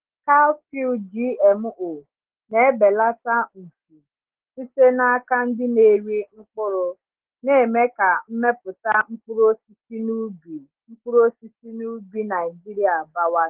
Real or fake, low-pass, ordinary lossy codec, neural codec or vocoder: real; 3.6 kHz; Opus, 16 kbps; none